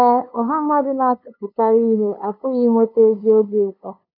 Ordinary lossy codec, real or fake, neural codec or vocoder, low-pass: none; fake; codec, 16 kHz, 2 kbps, FunCodec, trained on LibriTTS, 25 frames a second; 5.4 kHz